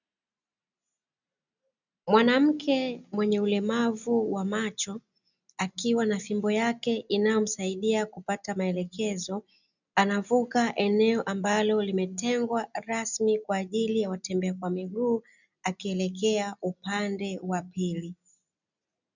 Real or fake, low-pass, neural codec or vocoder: real; 7.2 kHz; none